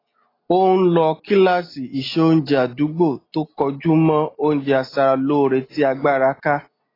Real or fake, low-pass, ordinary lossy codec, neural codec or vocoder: real; 5.4 kHz; AAC, 24 kbps; none